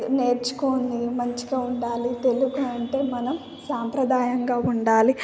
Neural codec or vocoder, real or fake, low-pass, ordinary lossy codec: none; real; none; none